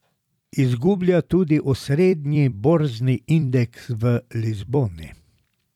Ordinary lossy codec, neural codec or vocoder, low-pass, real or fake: none; vocoder, 44.1 kHz, 128 mel bands every 256 samples, BigVGAN v2; 19.8 kHz; fake